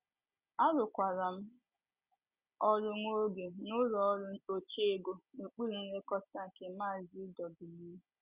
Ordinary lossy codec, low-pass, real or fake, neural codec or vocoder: Opus, 64 kbps; 3.6 kHz; real; none